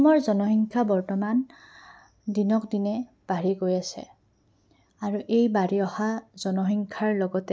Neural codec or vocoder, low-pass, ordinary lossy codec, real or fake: none; none; none; real